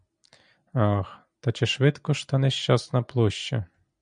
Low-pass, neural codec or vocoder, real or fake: 9.9 kHz; none; real